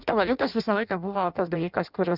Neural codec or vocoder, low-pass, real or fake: codec, 16 kHz in and 24 kHz out, 0.6 kbps, FireRedTTS-2 codec; 5.4 kHz; fake